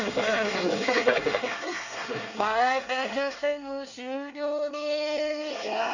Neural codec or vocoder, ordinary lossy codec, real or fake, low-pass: codec, 24 kHz, 1 kbps, SNAC; none; fake; 7.2 kHz